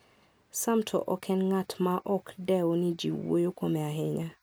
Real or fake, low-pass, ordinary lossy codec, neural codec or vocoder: real; none; none; none